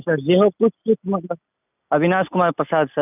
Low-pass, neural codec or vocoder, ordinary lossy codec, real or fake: 3.6 kHz; none; none; real